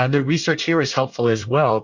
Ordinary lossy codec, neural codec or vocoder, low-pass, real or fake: Opus, 64 kbps; codec, 24 kHz, 1 kbps, SNAC; 7.2 kHz; fake